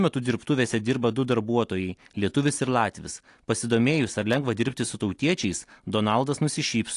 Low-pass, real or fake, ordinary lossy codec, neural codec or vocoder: 10.8 kHz; real; AAC, 48 kbps; none